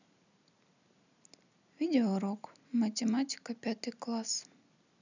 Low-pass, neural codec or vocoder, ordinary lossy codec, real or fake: 7.2 kHz; none; none; real